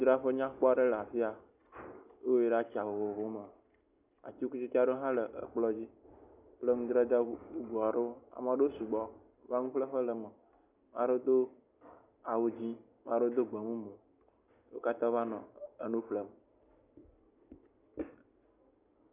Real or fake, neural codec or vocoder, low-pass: real; none; 3.6 kHz